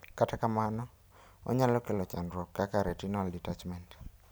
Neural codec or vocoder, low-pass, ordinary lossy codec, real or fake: none; none; none; real